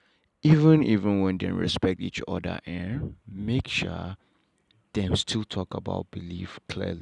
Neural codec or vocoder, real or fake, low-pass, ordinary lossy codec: none; real; 10.8 kHz; none